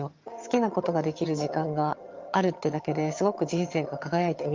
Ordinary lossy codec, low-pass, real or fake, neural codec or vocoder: Opus, 24 kbps; 7.2 kHz; fake; vocoder, 22.05 kHz, 80 mel bands, HiFi-GAN